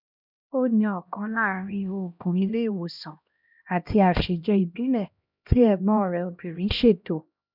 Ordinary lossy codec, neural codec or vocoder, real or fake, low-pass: none; codec, 16 kHz, 1 kbps, X-Codec, HuBERT features, trained on LibriSpeech; fake; 5.4 kHz